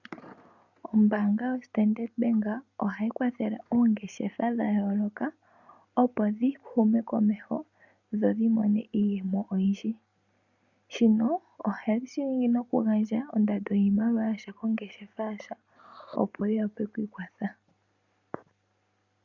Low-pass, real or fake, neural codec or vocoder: 7.2 kHz; real; none